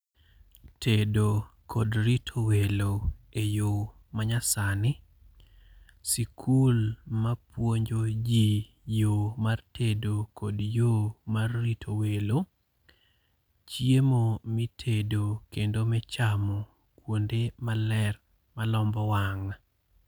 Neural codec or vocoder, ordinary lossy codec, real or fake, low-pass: none; none; real; none